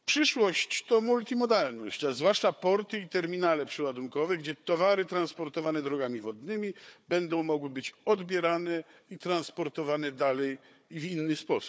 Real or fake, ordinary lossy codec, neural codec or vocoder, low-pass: fake; none; codec, 16 kHz, 4 kbps, FunCodec, trained on Chinese and English, 50 frames a second; none